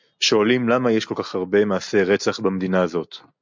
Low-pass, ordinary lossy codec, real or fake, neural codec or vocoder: 7.2 kHz; MP3, 64 kbps; real; none